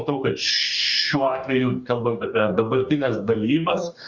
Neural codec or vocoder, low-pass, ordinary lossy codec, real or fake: codec, 44.1 kHz, 2.6 kbps, DAC; 7.2 kHz; Opus, 64 kbps; fake